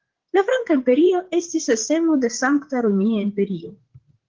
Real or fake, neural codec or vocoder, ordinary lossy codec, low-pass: fake; vocoder, 44.1 kHz, 128 mel bands, Pupu-Vocoder; Opus, 16 kbps; 7.2 kHz